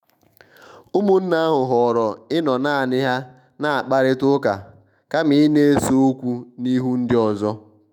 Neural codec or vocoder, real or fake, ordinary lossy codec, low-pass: autoencoder, 48 kHz, 128 numbers a frame, DAC-VAE, trained on Japanese speech; fake; none; 19.8 kHz